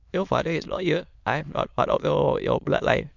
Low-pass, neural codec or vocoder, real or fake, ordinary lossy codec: 7.2 kHz; autoencoder, 22.05 kHz, a latent of 192 numbers a frame, VITS, trained on many speakers; fake; MP3, 64 kbps